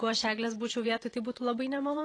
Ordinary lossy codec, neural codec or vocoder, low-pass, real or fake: AAC, 32 kbps; vocoder, 44.1 kHz, 128 mel bands every 512 samples, BigVGAN v2; 9.9 kHz; fake